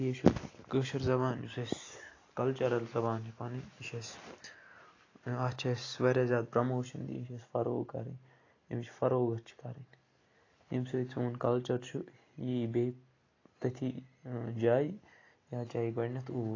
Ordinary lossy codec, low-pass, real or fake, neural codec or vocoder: AAC, 32 kbps; 7.2 kHz; real; none